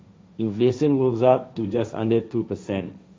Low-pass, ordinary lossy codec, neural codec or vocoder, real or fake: none; none; codec, 16 kHz, 1.1 kbps, Voila-Tokenizer; fake